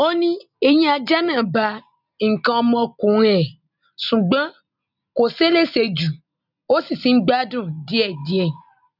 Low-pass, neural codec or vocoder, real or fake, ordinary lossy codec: 5.4 kHz; none; real; none